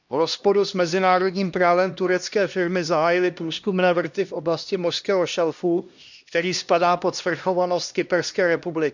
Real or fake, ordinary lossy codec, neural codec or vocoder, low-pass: fake; none; codec, 16 kHz, 1 kbps, X-Codec, HuBERT features, trained on LibriSpeech; 7.2 kHz